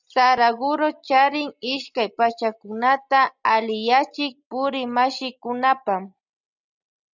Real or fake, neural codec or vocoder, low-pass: real; none; 7.2 kHz